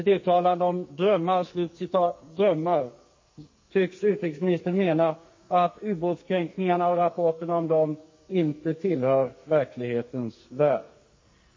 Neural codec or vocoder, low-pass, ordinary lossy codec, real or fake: codec, 44.1 kHz, 2.6 kbps, SNAC; 7.2 kHz; MP3, 32 kbps; fake